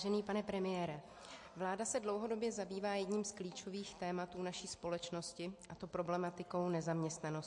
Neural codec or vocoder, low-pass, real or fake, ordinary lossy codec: none; 10.8 kHz; real; MP3, 48 kbps